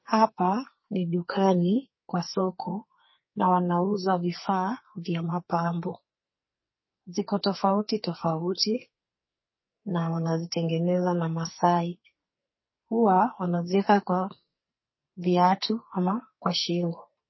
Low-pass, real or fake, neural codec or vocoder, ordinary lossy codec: 7.2 kHz; fake; codec, 44.1 kHz, 2.6 kbps, SNAC; MP3, 24 kbps